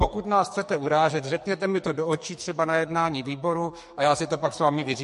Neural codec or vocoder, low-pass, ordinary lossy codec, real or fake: codec, 44.1 kHz, 2.6 kbps, SNAC; 14.4 kHz; MP3, 48 kbps; fake